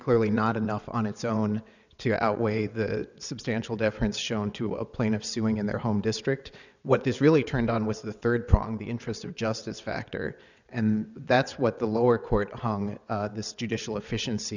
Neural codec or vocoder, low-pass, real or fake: vocoder, 22.05 kHz, 80 mel bands, WaveNeXt; 7.2 kHz; fake